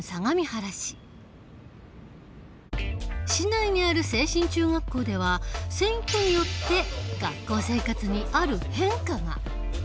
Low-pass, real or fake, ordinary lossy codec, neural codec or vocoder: none; real; none; none